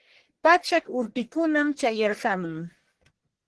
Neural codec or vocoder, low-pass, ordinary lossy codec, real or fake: codec, 44.1 kHz, 1.7 kbps, Pupu-Codec; 10.8 kHz; Opus, 16 kbps; fake